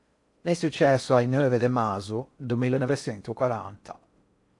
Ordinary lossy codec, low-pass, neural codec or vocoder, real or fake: AAC, 64 kbps; 10.8 kHz; codec, 16 kHz in and 24 kHz out, 0.6 kbps, FocalCodec, streaming, 4096 codes; fake